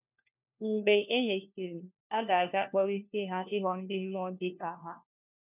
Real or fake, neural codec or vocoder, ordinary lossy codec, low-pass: fake; codec, 16 kHz, 1 kbps, FunCodec, trained on LibriTTS, 50 frames a second; none; 3.6 kHz